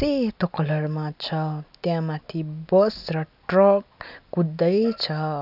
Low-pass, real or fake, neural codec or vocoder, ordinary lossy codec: 5.4 kHz; real; none; none